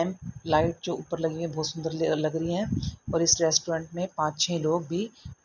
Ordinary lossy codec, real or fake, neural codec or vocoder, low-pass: none; real; none; 7.2 kHz